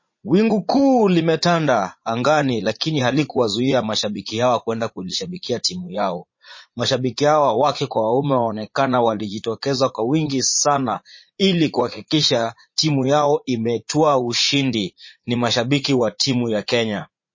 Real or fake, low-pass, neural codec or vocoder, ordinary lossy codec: fake; 7.2 kHz; vocoder, 44.1 kHz, 80 mel bands, Vocos; MP3, 32 kbps